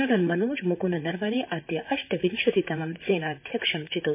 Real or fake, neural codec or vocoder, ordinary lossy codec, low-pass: fake; vocoder, 44.1 kHz, 128 mel bands, Pupu-Vocoder; MP3, 24 kbps; 3.6 kHz